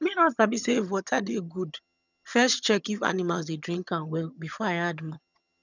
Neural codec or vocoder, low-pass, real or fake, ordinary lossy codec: vocoder, 22.05 kHz, 80 mel bands, HiFi-GAN; 7.2 kHz; fake; none